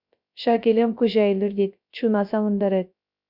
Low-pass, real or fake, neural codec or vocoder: 5.4 kHz; fake; codec, 16 kHz, 0.3 kbps, FocalCodec